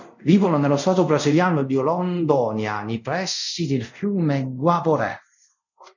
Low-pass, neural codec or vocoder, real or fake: 7.2 kHz; codec, 24 kHz, 0.5 kbps, DualCodec; fake